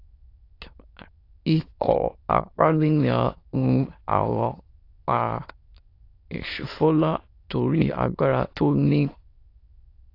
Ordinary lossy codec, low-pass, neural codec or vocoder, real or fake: AAC, 32 kbps; 5.4 kHz; autoencoder, 22.05 kHz, a latent of 192 numbers a frame, VITS, trained on many speakers; fake